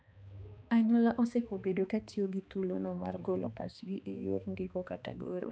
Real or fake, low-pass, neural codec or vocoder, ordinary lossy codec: fake; none; codec, 16 kHz, 2 kbps, X-Codec, HuBERT features, trained on balanced general audio; none